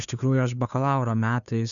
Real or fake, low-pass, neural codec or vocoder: fake; 7.2 kHz; codec, 16 kHz, 2 kbps, FunCodec, trained on Chinese and English, 25 frames a second